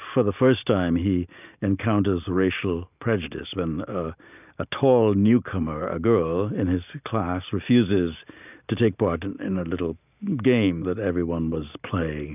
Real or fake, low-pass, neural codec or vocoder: real; 3.6 kHz; none